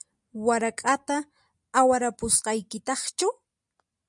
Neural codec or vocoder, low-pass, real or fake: none; 10.8 kHz; real